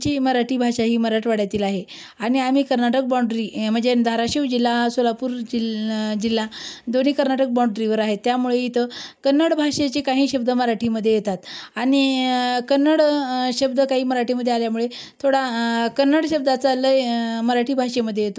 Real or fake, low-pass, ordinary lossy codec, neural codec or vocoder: real; none; none; none